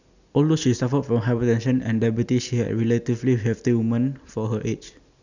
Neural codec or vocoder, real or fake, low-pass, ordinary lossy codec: none; real; 7.2 kHz; none